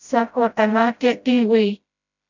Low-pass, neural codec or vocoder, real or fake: 7.2 kHz; codec, 16 kHz, 0.5 kbps, FreqCodec, smaller model; fake